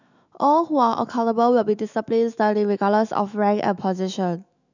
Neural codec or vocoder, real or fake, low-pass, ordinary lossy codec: autoencoder, 48 kHz, 128 numbers a frame, DAC-VAE, trained on Japanese speech; fake; 7.2 kHz; none